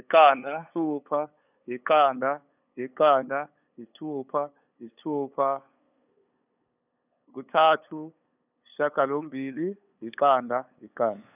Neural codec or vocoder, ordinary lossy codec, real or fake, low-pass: codec, 16 kHz, 8 kbps, FunCodec, trained on LibriTTS, 25 frames a second; none; fake; 3.6 kHz